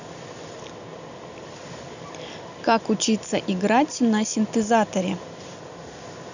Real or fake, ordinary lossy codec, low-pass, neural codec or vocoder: real; none; 7.2 kHz; none